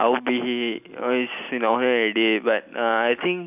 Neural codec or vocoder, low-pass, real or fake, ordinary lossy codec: none; 3.6 kHz; real; none